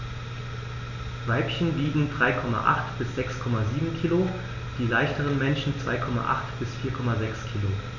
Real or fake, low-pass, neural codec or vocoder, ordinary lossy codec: real; 7.2 kHz; none; none